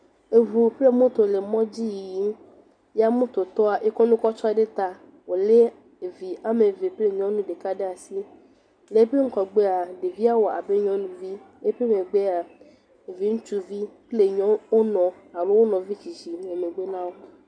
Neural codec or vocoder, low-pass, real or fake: none; 9.9 kHz; real